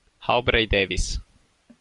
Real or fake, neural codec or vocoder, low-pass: real; none; 10.8 kHz